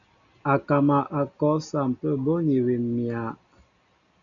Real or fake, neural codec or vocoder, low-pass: real; none; 7.2 kHz